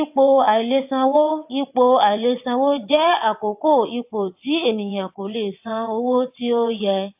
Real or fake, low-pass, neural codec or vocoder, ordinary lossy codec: fake; 3.6 kHz; vocoder, 22.05 kHz, 80 mel bands, WaveNeXt; AAC, 32 kbps